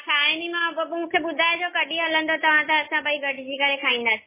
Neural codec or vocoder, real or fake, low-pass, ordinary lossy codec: none; real; 3.6 kHz; MP3, 16 kbps